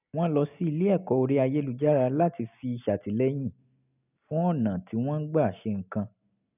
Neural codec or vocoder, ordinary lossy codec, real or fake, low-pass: none; none; real; 3.6 kHz